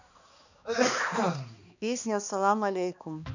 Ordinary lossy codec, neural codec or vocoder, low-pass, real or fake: none; codec, 16 kHz, 2 kbps, X-Codec, HuBERT features, trained on balanced general audio; 7.2 kHz; fake